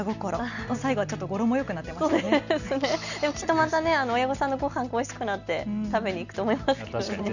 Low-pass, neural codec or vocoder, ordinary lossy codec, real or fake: 7.2 kHz; none; none; real